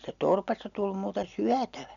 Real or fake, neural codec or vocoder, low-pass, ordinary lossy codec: real; none; 7.2 kHz; none